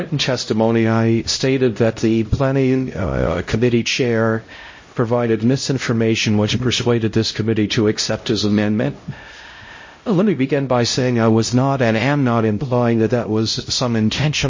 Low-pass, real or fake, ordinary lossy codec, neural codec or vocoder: 7.2 kHz; fake; MP3, 32 kbps; codec, 16 kHz, 0.5 kbps, X-Codec, HuBERT features, trained on LibriSpeech